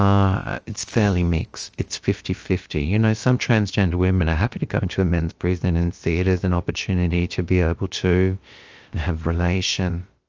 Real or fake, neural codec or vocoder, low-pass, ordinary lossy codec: fake; codec, 16 kHz, about 1 kbps, DyCAST, with the encoder's durations; 7.2 kHz; Opus, 24 kbps